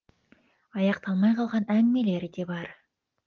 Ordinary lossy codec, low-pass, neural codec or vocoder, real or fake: Opus, 32 kbps; 7.2 kHz; none; real